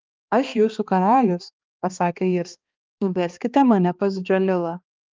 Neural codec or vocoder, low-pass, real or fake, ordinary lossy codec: codec, 16 kHz, 2 kbps, X-Codec, HuBERT features, trained on balanced general audio; 7.2 kHz; fake; Opus, 24 kbps